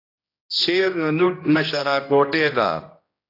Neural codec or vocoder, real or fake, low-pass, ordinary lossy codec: codec, 16 kHz, 1 kbps, X-Codec, HuBERT features, trained on general audio; fake; 5.4 kHz; AAC, 32 kbps